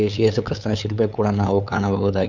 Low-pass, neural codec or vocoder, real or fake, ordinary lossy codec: 7.2 kHz; codec, 16 kHz, 8 kbps, FunCodec, trained on LibriTTS, 25 frames a second; fake; none